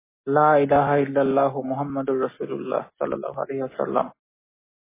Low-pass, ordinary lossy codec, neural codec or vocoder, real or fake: 3.6 kHz; MP3, 16 kbps; none; real